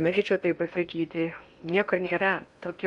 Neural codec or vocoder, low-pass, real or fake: codec, 16 kHz in and 24 kHz out, 0.8 kbps, FocalCodec, streaming, 65536 codes; 10.8 kHz; fake